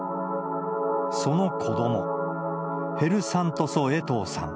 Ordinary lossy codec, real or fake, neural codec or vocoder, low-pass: none; real; none; none